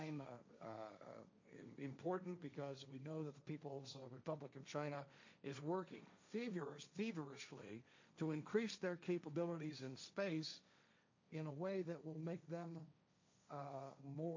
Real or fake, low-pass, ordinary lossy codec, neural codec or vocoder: fake; 7.2 kHz; MP3, 64 kbps; codec, 16 kHz, 1.1 kbps, Voila-Tokenizer